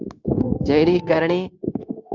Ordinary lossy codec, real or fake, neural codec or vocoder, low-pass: Opus, 64 kbps; fake; codec, 16 kHz, 0.9 kbps, LongCat-Audio-Codec; 7.2 kHz